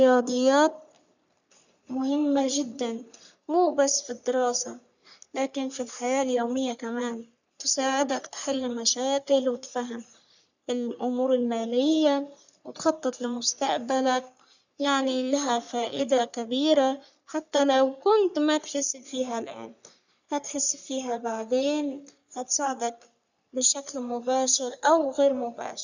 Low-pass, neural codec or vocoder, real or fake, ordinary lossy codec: 7.2 kHz; codec, 44.1 kHz, 3.4 kbps, Pupu-Codec; fake; none